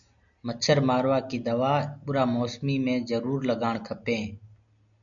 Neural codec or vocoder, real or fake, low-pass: none; real; 7.2 kHz